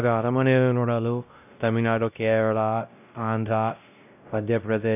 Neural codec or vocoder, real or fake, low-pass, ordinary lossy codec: codec, 16 kHz, 0.5 kbps, X-Codec, WavLM features, trained on Multilingual LibriSpeech; fake; 3.6 kHz; none